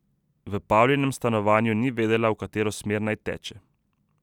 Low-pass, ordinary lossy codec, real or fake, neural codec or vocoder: 19.8 kHz; none; real; none